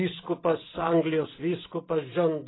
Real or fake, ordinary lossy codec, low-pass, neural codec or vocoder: fake; AAC, 16 kbps; 7.2 kHz; vocoder, 44.1 kHz, 128 mel bands every 256 samples, BigVGAN v2